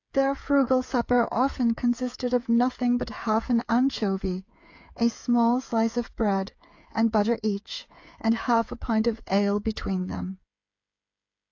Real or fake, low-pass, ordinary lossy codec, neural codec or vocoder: fake; 7.2 kHz; AAC, 48 kbps; codec, 16 kHz, 8 kbps, FreqCodec, smaller model